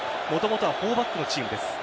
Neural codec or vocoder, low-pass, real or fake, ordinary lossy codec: none; none; real; none